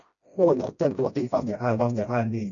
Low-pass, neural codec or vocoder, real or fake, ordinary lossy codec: 7.2 kHz; codec, 16 kHz, 1 kbps, FreqCodec, smaller model; fake; AAC, 64 kbps